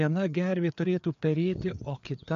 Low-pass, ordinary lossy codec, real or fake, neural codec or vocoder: 7.2 kHz; AAC, 64 kbps; fake; codec, 16 kHz, 4 kbps, FreqCodec, larger model